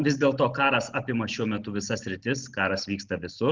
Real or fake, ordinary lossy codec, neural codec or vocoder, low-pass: real; Opus, 16 kbps; none; 7.2 kHz